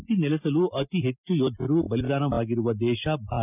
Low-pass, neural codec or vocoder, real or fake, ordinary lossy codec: 3.6 kHz; none; real; none